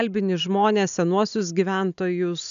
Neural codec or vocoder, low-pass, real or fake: none; 7.2 kHz; real